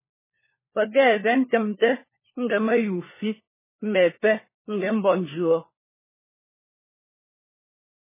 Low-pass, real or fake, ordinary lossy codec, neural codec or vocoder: 3.6 kHz; fake; MP3, 16 kbps; codec, 16 kHz, 4 kbps, FunCodec, trained on LibriTTS, 50 frames a second